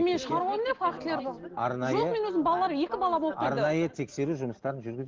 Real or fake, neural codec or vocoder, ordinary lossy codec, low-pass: real; none; Opus, 16 kbps; 7.2 kHz